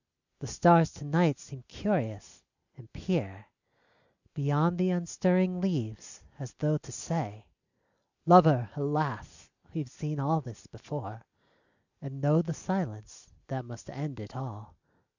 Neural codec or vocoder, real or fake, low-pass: none; real; 7.2 kHz